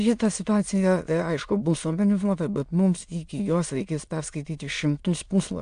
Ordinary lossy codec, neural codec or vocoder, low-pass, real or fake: AAC, 64 kbps; autoencoder, 22.05 kHz, a latent of 192 numbers a frame, VITS, trained on many speakers; 9.9 kHz; fake